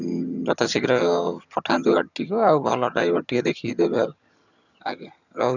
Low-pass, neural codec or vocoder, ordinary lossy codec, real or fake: 7.2 kHz; vocoder, 22.05 kHz, 80 mel bands, HiFi-GAN; none; fake